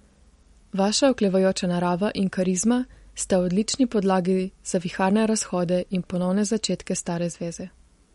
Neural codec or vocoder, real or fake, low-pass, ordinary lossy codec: none; real; 19.8 kHz; MP3, 48 kbps